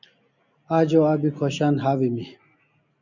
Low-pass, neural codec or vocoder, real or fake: 7.2 kHz; none; real